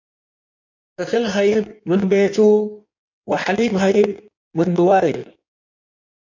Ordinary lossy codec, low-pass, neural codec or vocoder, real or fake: MP3, 48 kbps; 7.2 kHz; codec, 16 kHz in and 24 kHz out, 1.1 kbps, FireRedTTS-2 codec; fake